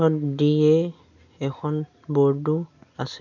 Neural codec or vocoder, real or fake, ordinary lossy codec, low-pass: none; real; none; 7.2 kHz